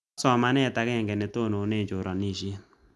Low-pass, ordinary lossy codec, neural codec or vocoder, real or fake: none; none; none; real